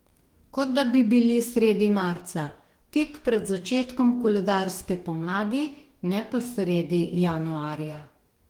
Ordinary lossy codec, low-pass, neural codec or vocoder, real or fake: Opus, 24 kbps; 19.8 kHz; codec, 44.1 kHz, 2.6 kbps, DAC; fake